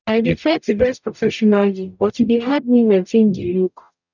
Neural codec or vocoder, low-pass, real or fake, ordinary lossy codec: codec, 44.1 kHz, 0.9 kbps, DAC; 7.2 kHz; fake; none